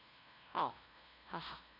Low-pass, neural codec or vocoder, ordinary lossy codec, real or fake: 5.4 kHz; codec, 16 kHz, 0.5 kbps, FunCodec, trained on LibriTTS, 25 frames a second; none; fake